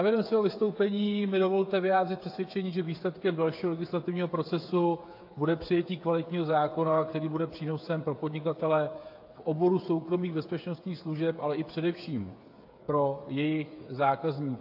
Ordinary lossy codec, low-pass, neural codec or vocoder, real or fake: AAC, 32 kbps; 5.4 kHz; codec, 16 kHz, 8 kbps, FreqCodec, smaller model; fake